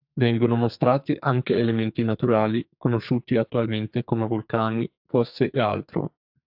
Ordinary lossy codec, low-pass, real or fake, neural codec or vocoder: AAC, 48 kbps; 5.4 kHz; fake; codec, 44.1 kHz, 2.6 kbps, SNAC